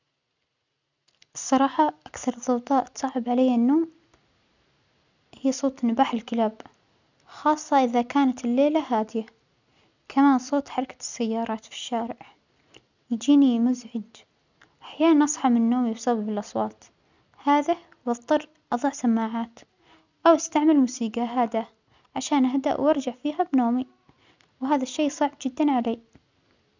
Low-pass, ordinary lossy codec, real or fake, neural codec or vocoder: 7.2 kHz; none; real; none